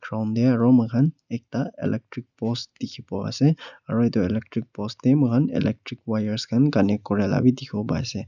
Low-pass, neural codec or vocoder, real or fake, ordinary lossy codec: 7.2 kHz; none; real; none